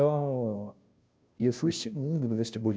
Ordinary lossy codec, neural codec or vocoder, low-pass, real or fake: none; codec, 16 kHz, 0.5 kbps, FunCodec, trained on Chinese and English, 25 frames a second; none; fake